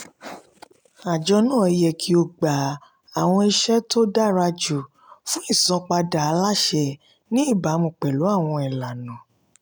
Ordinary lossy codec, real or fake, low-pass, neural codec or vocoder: none; real; none; none